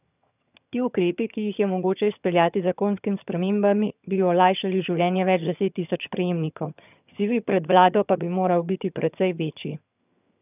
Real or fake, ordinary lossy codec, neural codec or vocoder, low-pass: fake; none; vocoder, 22.05 kHz, 80 mel bands, HiFi-GAN; 3.6 kHz